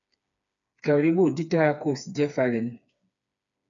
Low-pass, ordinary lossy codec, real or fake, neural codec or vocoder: 7.2 kHz; MP3, 64 kbps; fake; codec, 16 kHz, 4 kbps, FreqCodec, smaller model